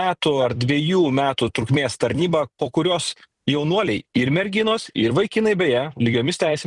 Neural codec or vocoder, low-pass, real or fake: vocoder, 44.1 kHz, 128 mel bands every 256 samples, BigVGAN v2; 10.8 kHz; fake